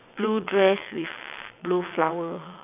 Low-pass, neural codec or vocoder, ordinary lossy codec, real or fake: 3.6 kHz; vocoder, 22.05 kHz, 80 mel bands, WaveNeXt; none; fake